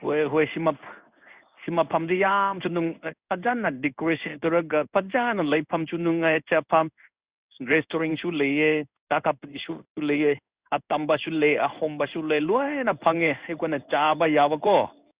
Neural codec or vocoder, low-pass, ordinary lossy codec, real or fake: codec, 16 kHz in and 24 kHz out, 1 kbps, XY-Tokenizer; 3.6 kHz; Opus, 64 kbps; fake